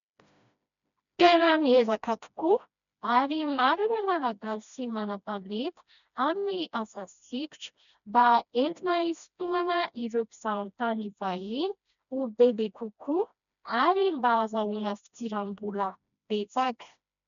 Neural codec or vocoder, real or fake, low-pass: codec, 16 kHz, 1 kbps, FreqCodec, smaller model; fake; 7.2 kHz